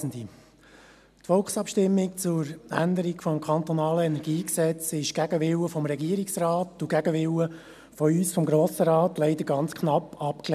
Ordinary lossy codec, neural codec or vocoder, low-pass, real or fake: none; none; 14.4 kHz; real